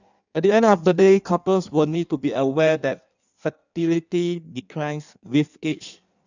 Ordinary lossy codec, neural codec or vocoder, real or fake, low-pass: none; codec, 16 kHz in and 24 kHz out, 1.1 kbps, FireRedTTS-2 codec; fake; 7.2 kHz